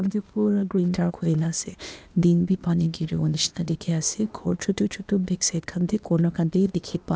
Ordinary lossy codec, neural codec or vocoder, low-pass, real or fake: none; codec, 16 kHz, 0.8 kbps, ZipCodec; none; fake